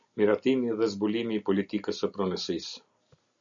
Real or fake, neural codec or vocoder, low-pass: real; none; 7.2 kHz